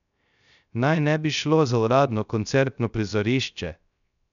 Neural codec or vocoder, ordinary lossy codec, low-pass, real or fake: codec, 16 kHz, 0.3 kbps, FocalCodec; none; 7.2 kHz; fake